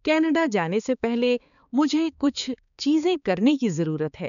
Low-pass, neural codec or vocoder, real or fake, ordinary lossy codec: 7.2 kHz; codec, 16 kHz, 4 kbps, X-Codec, HuBERT features, trained on balanced general audio; fake; none